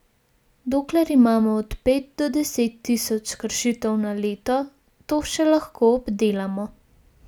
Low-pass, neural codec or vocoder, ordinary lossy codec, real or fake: none; none; none; real